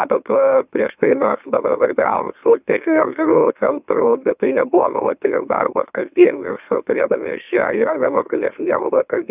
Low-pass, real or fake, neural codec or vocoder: 3.6 kHz; fake; autoencoder, 44.1 kHz, a latent of 192 numbers a frame, MeloTTS